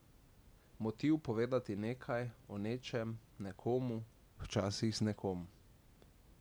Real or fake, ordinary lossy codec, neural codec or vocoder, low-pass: real; none; none; none